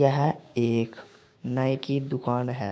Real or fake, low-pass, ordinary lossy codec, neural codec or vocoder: fake; none; none; codec, 16 kHz, 6 kbps, DAC